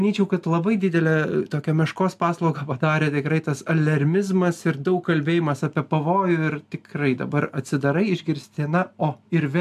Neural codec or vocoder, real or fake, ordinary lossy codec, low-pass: none; real; MP3, 96 kbps; 14.4 kHz